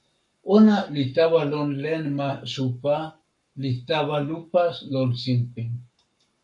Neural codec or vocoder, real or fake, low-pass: codec, 44.1 kHz, 7.8 kbps, Pupu-Codec; fake; 10.8 kHz